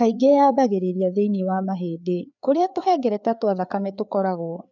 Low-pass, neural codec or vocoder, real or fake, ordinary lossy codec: 7.2 kHz; codec, 16 kHz, 4 kbps, FreqCodec, larger model; fake; none